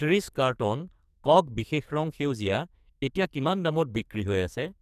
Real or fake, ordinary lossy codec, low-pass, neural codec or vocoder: fake; none; 14.4 kHz; codec, 44.1 kHz, 2.6 kbps, SNAC